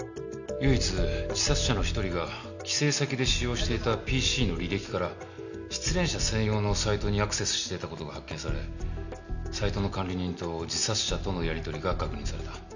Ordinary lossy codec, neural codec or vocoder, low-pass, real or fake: none; none; 7.2 kHz; real